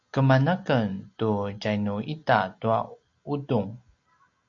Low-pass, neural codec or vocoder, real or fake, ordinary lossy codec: 7.2 kHz; none; real; MP3, 48 kbps